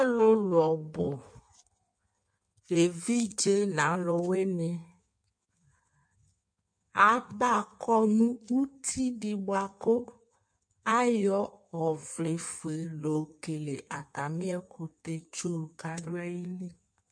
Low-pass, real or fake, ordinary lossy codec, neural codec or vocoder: 9.9 kHz; fake; MP3, 48 kbps; codec, 16 kHz in and 24 kHz out, 1.1 kbps, FireRedTTS-2 codec